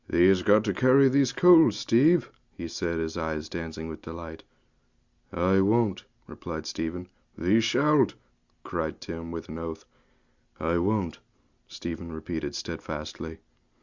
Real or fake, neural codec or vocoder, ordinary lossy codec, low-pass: real; none; Opus, 64 kbps; 7.2 kHz